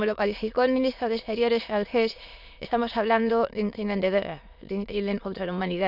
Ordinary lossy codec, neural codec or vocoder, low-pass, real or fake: none; autoencoder, 22.05 kHz, a latent of 192 numbers a frame, VITS, trained on many speakers; 5.4 kHz; fake